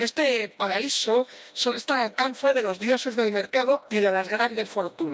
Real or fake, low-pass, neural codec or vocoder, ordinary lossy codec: fake; none; codec, 16 kHz, 1 kbps, FreqCodec, smaller model; none